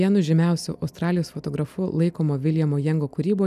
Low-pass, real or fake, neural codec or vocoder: 14.4 kHz; real; none